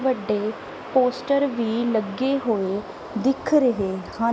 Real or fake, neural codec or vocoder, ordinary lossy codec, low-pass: real; none; none; none